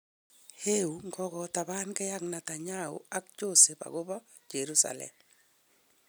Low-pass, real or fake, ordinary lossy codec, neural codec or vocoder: none; real; none; none